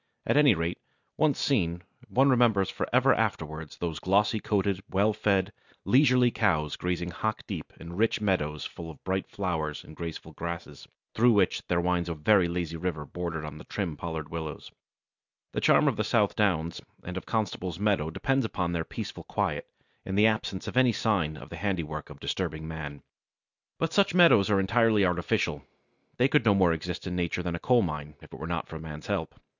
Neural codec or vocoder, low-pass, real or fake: none; 7.2 kHz; real